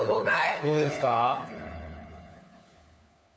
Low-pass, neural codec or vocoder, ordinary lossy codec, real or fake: none; codec, 16 kHz, 4 kbps, FunCodec, trained on LibriTTS, 50 frames a second; none; fake